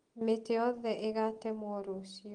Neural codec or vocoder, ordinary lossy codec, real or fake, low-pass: none; Opus, 24 kbps; real; 9.9 kHz